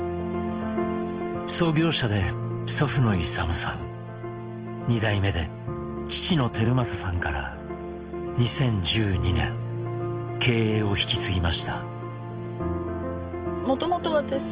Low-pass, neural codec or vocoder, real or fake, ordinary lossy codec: 3.6 kHz; none; real; Opus, 16 kbps